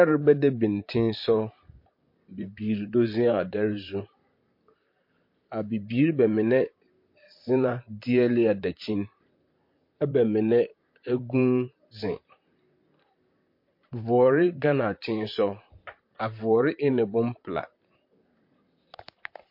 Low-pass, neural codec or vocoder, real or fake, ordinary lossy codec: 5.4 kHz; vocoder, 44.1 kHz, 128 mel bands, Pupu-Vocoder; fake; MP3, 32 kbps